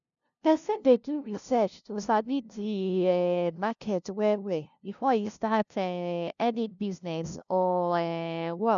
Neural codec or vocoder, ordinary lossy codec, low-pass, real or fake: codec, 16 kHz, 0.5 kbps, FunCodec, trained on LibriTTS, 25 frames a second; none; 7.2 kHz; fake